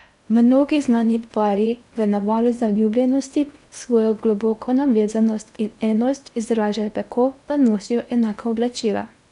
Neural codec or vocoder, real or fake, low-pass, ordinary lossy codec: codec, 16 kHz in and 24 kHz out, 0.8 kbps, FocalCodec, streaming, 65536 codes; fake; 10.8 kHz; none